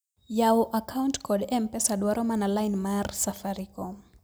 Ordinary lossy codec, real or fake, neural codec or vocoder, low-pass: none; real; none; none